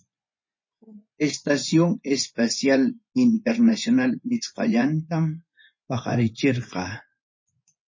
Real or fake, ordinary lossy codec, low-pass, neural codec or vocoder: fake; MP3, 32 kbps; 7.2 kHz; vocoder, 24 kHz, 100 mel bands, Vocos